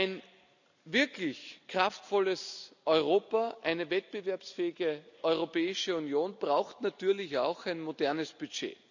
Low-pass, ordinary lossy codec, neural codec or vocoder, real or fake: 7.2 kHz; none; none; real